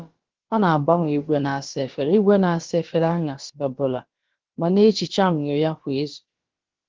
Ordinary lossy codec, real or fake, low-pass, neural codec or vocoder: Opus, 16 kbps; fake; 7.2 kHz; codec, 16 kHz, about 1 kbps, DyCAST, with the encoder's durations